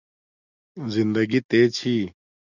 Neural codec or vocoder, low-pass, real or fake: none; 7.2 kHz; real